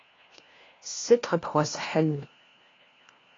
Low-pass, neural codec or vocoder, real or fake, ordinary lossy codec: 7.2 kHz; codec, 16 kHz, 1 kbps, FunCodec, trained on LibriTTS, 50 frames a second; fake; AAC, 32 kbps